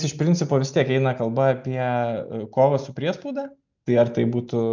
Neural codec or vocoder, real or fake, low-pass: none; real; 7.2 kHz